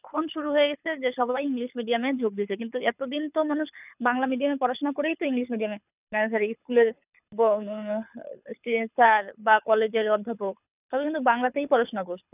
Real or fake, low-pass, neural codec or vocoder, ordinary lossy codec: fake; 3.6 kHz; codec, 24 kHz, 6 kbps, HILCodec; none